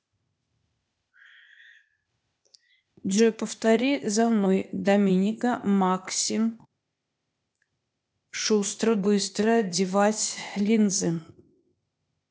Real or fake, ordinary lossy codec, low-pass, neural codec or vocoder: fake; none; none; codec, 16 kHz, 0.8 kbps, ZipCodec